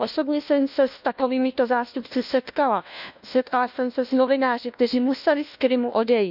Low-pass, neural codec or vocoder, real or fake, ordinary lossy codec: 5.4 kHz; codec, 16 kHz, 1 kbps, FunCodec, trained on LibriTTS, 50 frames a second; fake; none